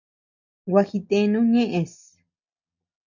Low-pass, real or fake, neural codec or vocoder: 7.2 kHz; real; none